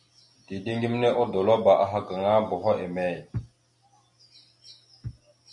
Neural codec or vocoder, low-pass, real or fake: none; 10.8 kHz; real